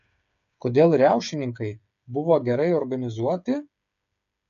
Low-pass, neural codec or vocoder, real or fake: 7.2 kHz; codec, 16 kHz, 8 kbps, FreqCodec, smaller model; fake